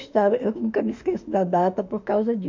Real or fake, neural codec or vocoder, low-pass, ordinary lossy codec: fake; autoencoder, 48 kHz, 32 numbers a frame, DAC-VAE, trained on Japanese speech; 7.2 kHz; MP3, 64 kbps